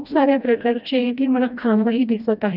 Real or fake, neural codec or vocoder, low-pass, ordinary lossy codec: fake; codec, 16 kHz, 1 kbps, FreqCodec, smaller model; 5.4 kHz; none